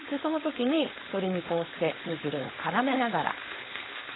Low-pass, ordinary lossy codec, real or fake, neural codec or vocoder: 7.2 kHz; AAC, 16 kbps; fake; codec, 16 kHz, 4.8 kbps, FACodec